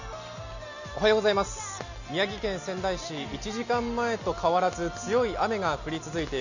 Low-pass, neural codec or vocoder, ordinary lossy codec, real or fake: 7.2 kHz; none; none; real